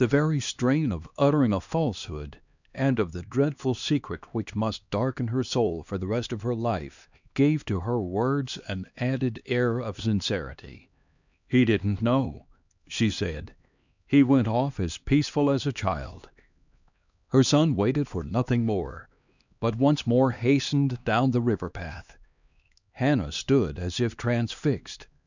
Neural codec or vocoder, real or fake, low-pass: codec, 16 kHz, 2 kbps, X-Codec, HuBERT features, trained on LibriSpeech; fake; 7.2 kHz